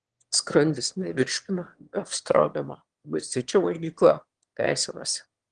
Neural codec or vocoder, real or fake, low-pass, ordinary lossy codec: autoencoder, 22.05 kHz, a latent of 192 numbers a frame, VITS, trained on one speaker; fake; 9.9 kHz; Opus, 24 kbps